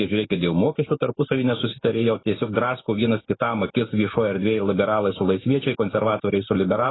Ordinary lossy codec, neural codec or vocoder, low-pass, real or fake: AAC, 16 kbps; autoencoder, 48 kHz, 128 numbers a frame, DAC-VAE, trained on Japanese speech; 7.2 kHz; fake